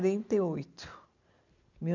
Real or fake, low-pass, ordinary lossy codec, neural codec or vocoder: real; 7.2 kHz; none; none